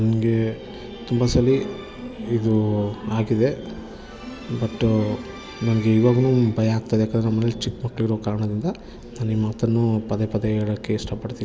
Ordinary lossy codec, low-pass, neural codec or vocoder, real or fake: none; none; none; real